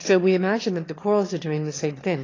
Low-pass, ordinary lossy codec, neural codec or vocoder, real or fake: 7.2 kHz; AAC, 32 kbps; autoencoder, 22.05 kHz, a latent of 192 numbers a frame, VITS, trained on one speaker; fake